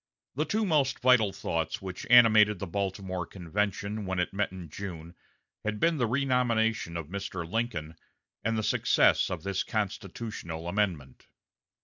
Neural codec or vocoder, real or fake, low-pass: none; real; 7.2 kHz